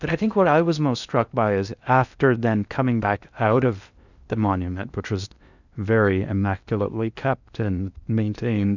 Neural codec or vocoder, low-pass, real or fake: codec, 16 kHz in and 24 kHz out, 0.6 kbps, FocalCodec, streaming, 4096 codes; 7.2 kHz; fake